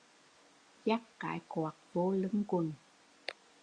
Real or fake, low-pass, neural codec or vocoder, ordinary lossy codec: real; 9.9 kHz; none; Opus, 64 kbps